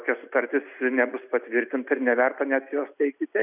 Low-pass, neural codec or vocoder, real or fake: 3.6 kHz; none; real